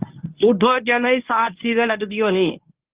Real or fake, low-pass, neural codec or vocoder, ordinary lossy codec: fake; 3.6 kHz; codec, 24 kHz, 0.9 kbps, WavTokenizer, medium speech release version 1; Opus, 32 kbps